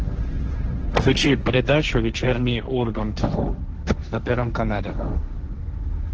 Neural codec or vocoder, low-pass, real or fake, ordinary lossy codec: codec, 16 kHz, 1.1 kbps, Voila-Tokenizer; 7.2 kHz; fake; Opus, 16 kbps